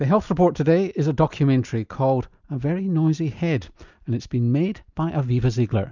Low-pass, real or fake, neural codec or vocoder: 7.2 kHz; real; none